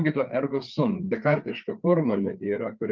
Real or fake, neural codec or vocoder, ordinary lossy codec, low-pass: fake; codec, 16 kHz, 4 kbps, FreqCodec, larger model; Opus, 24 kbps; 7.2 kHz